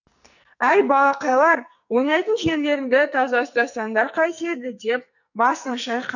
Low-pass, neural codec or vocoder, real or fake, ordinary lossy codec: 7.2 kHz; codec, 44.1 kHz, 2.6 kbps, SNAC; fake; none